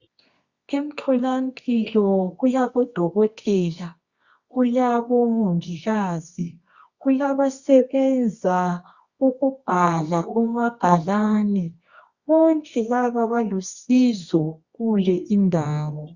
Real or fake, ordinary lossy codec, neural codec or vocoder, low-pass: fake; Opus, 64 kbps; codec, 24 kHz, 0.9 kbps, WavTokenizer, medium music audio release; 7.2 kHz